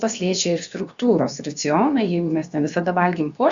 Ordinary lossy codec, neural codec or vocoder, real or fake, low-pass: Opus, 64 kbps; codec, 16 kHz, about 1 kbps, DyCAST, with the encoder's durations; fake; 7.2 kHz